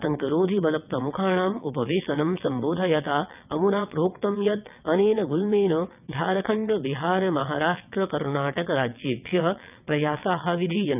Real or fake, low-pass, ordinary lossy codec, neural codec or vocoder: fake; 3.6 kHz; none; vocoder, 22.05 kHz, 80 mel bands, WaveNeXt